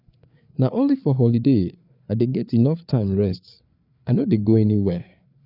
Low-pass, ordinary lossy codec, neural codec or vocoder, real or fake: 5.4 kHz; none; codec, 16 kHz, 4 kbps, FreqCodec, larger model; fake